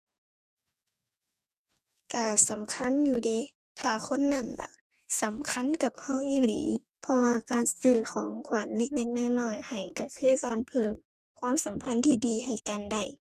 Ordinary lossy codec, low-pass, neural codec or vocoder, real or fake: none; 14.4 kHz; codec, 44.1 kHz, 2.6 kbps, DAC; fake